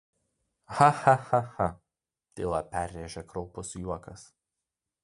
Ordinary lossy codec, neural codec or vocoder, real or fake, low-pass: MP3, 64 kbps; none; real; 10.8 kHz